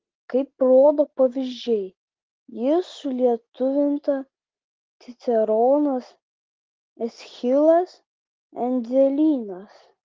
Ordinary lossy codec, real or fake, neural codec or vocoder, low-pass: Opus, 16 kbps; real; none; 7.2 kHz